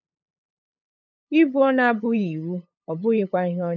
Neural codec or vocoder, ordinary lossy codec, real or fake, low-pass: codec, 16 kHz, 8 kbps, FunCodec, trained on LibriTTS, 25 frames a second; none; fake; none